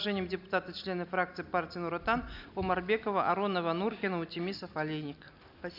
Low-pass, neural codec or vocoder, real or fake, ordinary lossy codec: 5.4 kHz; none; real; none